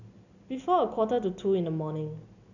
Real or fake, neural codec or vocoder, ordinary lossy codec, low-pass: real; none; none; 7.2 kHz